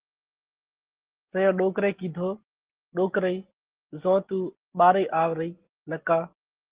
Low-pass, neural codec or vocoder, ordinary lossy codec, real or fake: 3.6 kHz; none; Opus, 16 kbps; real